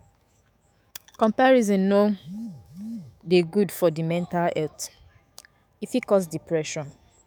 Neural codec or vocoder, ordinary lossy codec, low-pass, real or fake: autoencoder, 48 kHz, 128 numbers a frame, DAC-VAE, trained on Japanese speech; none; none; fake